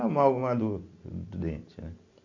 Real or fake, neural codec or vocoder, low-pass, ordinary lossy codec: real; none; 7.2 kHz; MP3, 48 kbps